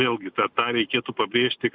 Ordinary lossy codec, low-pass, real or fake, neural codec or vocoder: MP3, 48 kbps; 5.4 kHz; real; none